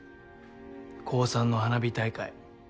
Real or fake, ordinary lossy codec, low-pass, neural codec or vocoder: real; none; none; none